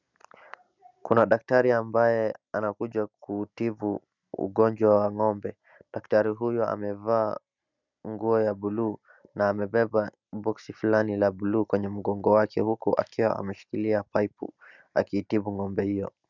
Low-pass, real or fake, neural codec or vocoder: 7.2 kHz; real; none